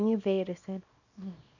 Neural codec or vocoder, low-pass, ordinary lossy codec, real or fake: codec, 24 kHz, 0.9 kbps, WavTokenizer, small release; 7.2 kHz; none; fake